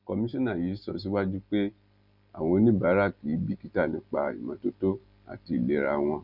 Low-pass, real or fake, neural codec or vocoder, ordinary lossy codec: 5.4 kHz; real; none; none